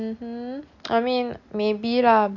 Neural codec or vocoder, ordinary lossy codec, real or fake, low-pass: none; none; real; 7.2 kHz